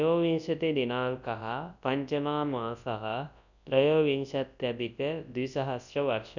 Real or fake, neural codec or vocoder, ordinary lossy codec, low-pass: fake; codec, 24 kHz, 0.9 kbps, WavTokenizer, large speech release; none; 7.2 kHz